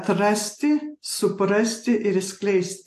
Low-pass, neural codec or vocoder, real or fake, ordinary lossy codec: 14.4 kHz; none; real; AAC, 64 kbps